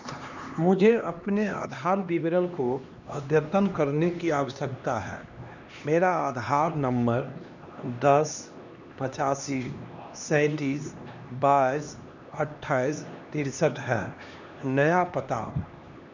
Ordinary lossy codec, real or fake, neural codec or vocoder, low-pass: none; fake; codec, 16 kHz, 2 kbps, X-Codec, HuBERT features, trained on LibriSpeech; 7.2 kHz